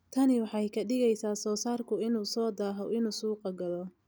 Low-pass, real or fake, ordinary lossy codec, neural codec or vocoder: none; real; none; none